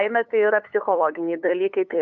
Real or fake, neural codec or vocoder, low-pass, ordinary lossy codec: fake; codec, 16 kHz, 8 kbps, FunCodec, trained on LibriTTS, 25 frames a second; 7.2 kHz; MP3, 96 kbps